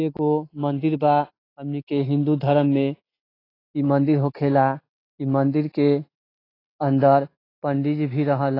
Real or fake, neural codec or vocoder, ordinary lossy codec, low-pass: real; none; AAC, 24 kbps; 5.4 kHz